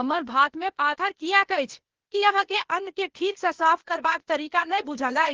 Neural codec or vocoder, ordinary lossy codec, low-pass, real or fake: codec, 16 kHz, 0.8 kbps, ZipCodec; Opus, 16 kbps; 7.2 kHz; fake